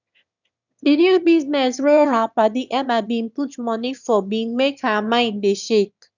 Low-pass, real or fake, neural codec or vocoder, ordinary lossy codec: 7.2 kHz; fake; autoencoder, 22.05 kHz, a latent of 192 numbers a frame, VITS, trained on one speaker; none